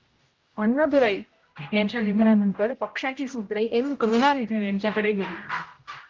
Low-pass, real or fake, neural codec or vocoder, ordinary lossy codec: 7.2 kHz; fake; codec, 16 kHz, 0.5 kbps, X-Codec, HuBERT features, trained on general audio; Opus, 32 kbps